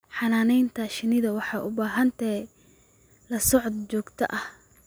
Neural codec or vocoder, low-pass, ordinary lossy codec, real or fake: none; none; none; real